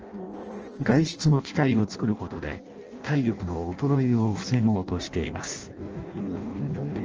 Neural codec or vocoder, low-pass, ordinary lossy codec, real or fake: codec, 16 kHz in and 24 kHz out, 0.6 kbps, FireRedTTS-2 codec; 7.2 kHz; Opus, 24 kbps; fake